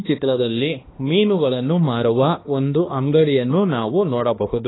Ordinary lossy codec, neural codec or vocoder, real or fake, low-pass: AAC, 16 kbps; codec, 16 kHz, 2 kbps, X-Codec, HuBERT features, trained on balanced general audio; fake; 7.2 kHz